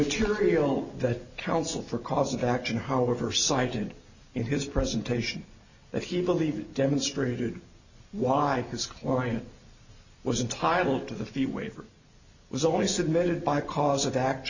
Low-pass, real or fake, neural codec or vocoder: 7.2 kHz; real; none